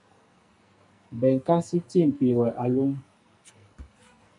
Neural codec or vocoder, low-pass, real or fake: codec, 44.1 kHz, 2.6 kbps, SNAC; 10.8 kHz; fake